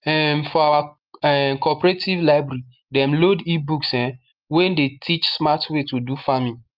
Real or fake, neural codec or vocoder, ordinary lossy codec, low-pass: real; none; Opus, 24 kbps; 5.4 kHz